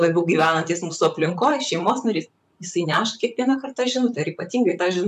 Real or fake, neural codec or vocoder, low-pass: fake; vocoder, 44.1 kHz, 128 mel bands, Pupu-Vocoder; 14.4 kHz